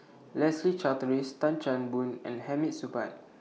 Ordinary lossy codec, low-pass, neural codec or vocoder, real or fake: none; none; none; real